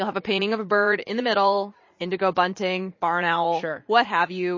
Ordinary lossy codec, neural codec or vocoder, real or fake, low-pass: MP3, 32 kbps; vocoder, 44.1 kHz, 80 mel bands, Vocos; fake; 7.2 kHz